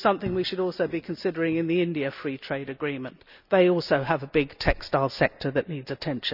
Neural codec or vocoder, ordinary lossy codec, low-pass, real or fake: none; none; 5.4 kHz; real